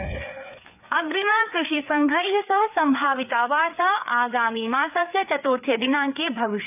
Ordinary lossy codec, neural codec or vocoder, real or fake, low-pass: none; codec, 16 kHz, 4 kbps, FreqCodec, larger model; fake; 3.6 kHz